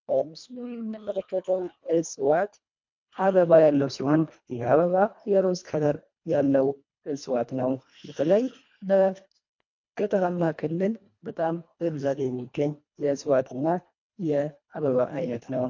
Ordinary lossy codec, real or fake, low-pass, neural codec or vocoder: MP3, 48 kbps; fake; 7.2 kHz; codec, 24 kHz, 1.5 kbps, HILCodec